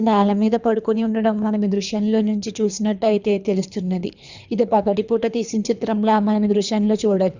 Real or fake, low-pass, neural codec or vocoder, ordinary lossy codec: fake; 7.2 kHz; codec, 24 kHz, 3 kbps, HILCodec; Opus, 64 kbps